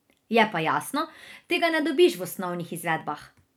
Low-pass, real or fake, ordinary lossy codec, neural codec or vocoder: none; fake; none; vocoder, 44.1 kHz, 128 mel bands every 256 samples, BigVGAN v2